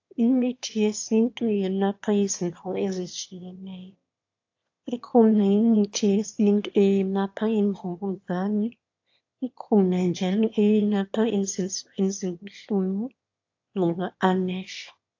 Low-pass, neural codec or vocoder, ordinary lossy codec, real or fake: 7.2 kHz; autoencoder, 22.05 kHz, a latent of 192 numbers a frame, VITS, trained on one speaker; AAC, 48 kbps; fake